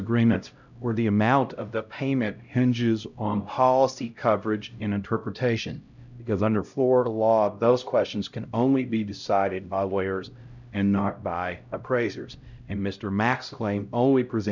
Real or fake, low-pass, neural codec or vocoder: fake; 7.2 kHz; codec, 16 kHz, 0.5 kbps, X-Codec, HuBERT features, trained on LibriSpeech